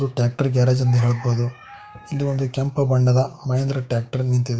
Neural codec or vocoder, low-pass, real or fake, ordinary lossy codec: codec, 16 kHz, 6 kbps, DAC; none; fake; none